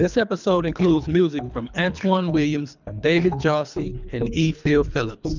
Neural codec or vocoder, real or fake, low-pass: codec, 24 kHz, 3 kbps, HILCodec; fake; 7.2 kHz